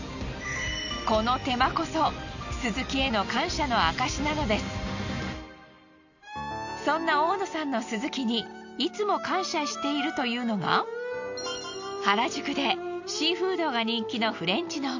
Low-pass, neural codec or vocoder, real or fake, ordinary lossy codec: 7.2 kHz; none; real; none